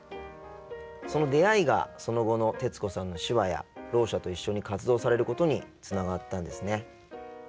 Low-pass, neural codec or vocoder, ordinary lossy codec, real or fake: none; none; none; real